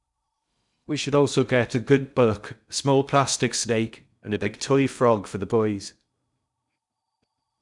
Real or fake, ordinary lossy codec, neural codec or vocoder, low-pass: fake; none; codec, 16 kHz in and 24 kHz out, 0.6 kbps, FocalCodec, streaming, 2048 codes; 10.8 kHz